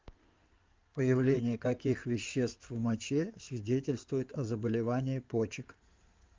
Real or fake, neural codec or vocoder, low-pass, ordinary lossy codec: fake; codec, 16 kHz in and 24 kHz out, 2.2 kbps, FireRedTTS-2 codec; 7.2 kHz; Opus, 32 kbps